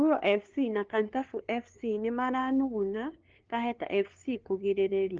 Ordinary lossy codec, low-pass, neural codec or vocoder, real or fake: Opus, 16 kbps; 7.2 kHz; codec, 16 kHz, 2 kbps, FunCodec, trained on LibriTTS, 25 frames a second; fake